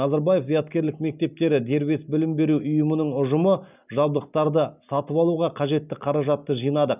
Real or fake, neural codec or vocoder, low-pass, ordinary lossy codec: real; none; 3.6 kHz; none